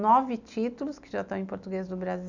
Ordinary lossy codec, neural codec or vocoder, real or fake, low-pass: none; none; real; 7.2 kHz